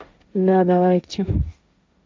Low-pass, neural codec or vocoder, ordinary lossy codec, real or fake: none; codec, 16 kHz, 1.1 kbps, Voila-Tokenizer; none; fake